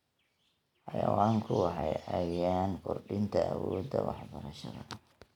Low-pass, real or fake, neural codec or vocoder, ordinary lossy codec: 19.8 kHz; real; none; none